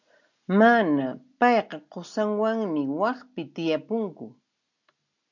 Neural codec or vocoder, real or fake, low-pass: none; real; 7.2 kHz